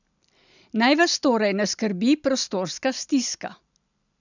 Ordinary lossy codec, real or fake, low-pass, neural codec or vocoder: none; real; 7.2 kHz; none